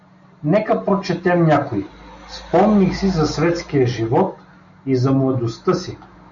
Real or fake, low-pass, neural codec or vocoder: real; 7.2 kHz; none